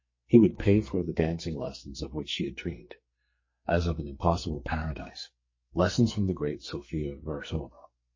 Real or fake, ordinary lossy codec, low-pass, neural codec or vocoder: fake; MP3, 32 kbps; 7.2 kHz; codec, 44.1 kHz, 2.6 kbps, SNAC